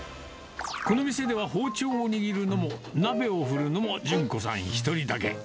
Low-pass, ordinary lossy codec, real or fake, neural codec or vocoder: none; none; real; none